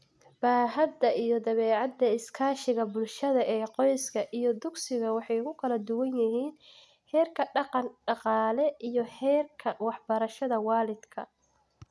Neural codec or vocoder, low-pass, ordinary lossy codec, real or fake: none; none; none; real